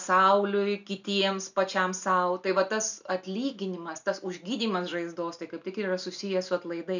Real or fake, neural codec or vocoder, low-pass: real; none; 7.2 kHz